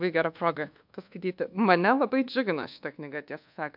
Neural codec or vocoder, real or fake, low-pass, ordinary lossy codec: codec, 24 kHz, 1.2 kbps, DualCodec; fake; 5.4 kHz; MP3, 48 kbps